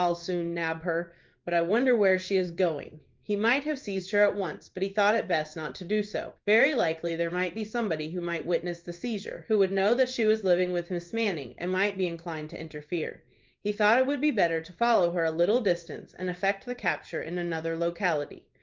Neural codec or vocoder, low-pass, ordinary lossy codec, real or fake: codec, 16 kHz in and 24 kHz out, 1 kbps, XY-Tokenizer; 7.2 kHz; Opus, 24 kbps; fake